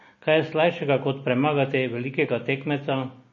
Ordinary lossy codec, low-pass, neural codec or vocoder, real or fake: MP3, 32 kbps; 7.2 kHz; none; real